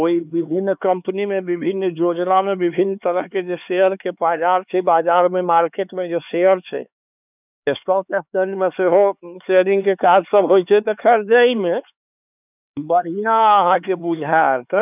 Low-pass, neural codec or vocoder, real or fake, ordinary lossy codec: 3.6 kHz; codec, 16 kHz, 4 kbps, X-Codec, HuBERT features, trained on LibriSpeech; fake; none